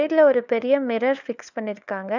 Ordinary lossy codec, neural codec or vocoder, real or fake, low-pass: none; codec, 16 kHz, 4 kbps, FunCodec, trained on LibriTTS, 50 frames a second; fake; 7.2 kHz